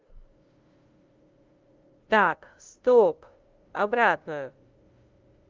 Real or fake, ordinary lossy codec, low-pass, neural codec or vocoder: fake; Opus, 32 kbps; 7.2 kHz; codec, 16 kHz, 0.5 kbps, FunCodec, trained on LibriTTS, 25 frames a second